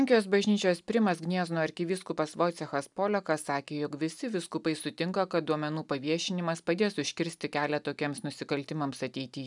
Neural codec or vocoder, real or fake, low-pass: none; real; 10.8 kHz